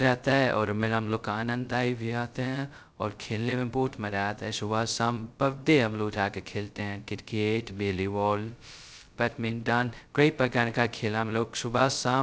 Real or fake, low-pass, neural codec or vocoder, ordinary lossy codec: fake; none; codec, 16 kHz, 0.2 kbps, FocalCodec; none